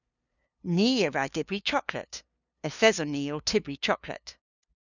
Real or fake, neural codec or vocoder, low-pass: fake; codec, 16 kHz, 2 kbps, FunCodec, trained on LibriTTS, 25 frames a second; 7.2 kHz